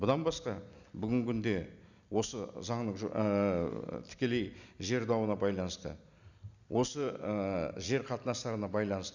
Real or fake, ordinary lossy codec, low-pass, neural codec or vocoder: real; none; 7.2 kHz; none